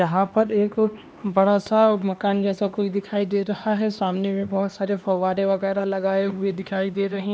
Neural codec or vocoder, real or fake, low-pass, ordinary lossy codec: codec, 16 kHz, 2 kbps, X-Codec, HuBERT features, trained on LibriSpeech; fake; none; none